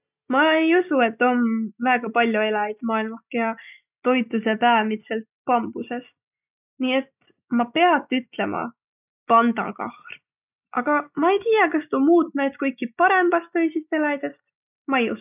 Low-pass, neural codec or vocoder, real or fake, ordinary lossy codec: 3.6 kHz; none; real; none